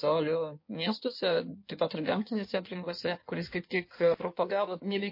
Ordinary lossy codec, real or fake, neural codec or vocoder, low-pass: MP3, 32 kbps; fake; codec, 16 kHz in and 24 kHz out, 1.1 kbps, FireRedTTS-2 codec; 5.4 kHz